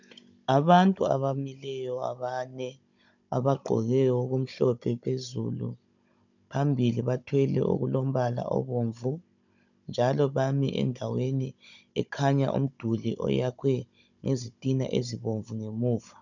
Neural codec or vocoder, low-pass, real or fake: codec, 16 kHz, 16 kbps, FunCodec, trained on Chinese and English, 50 frames a second; 7.2 kHz; fake